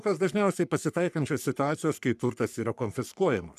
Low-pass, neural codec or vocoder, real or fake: 14.4 kHz; codec, 44.1 kHz, 3.4 kbps, Pupu-Codec; fake